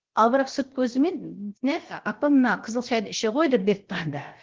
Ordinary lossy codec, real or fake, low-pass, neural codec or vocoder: Opus, 16 kbps; fake; 7.2 kHz; codec, 16 kHz, about 1 kbps, DyCAST, with the encoder's durations